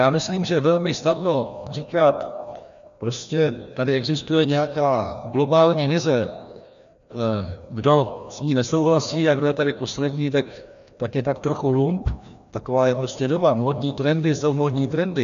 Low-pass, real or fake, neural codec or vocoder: 7.2 kHz; fake; codec, 16 kHz, 1 kbps, FreqCodec, larger model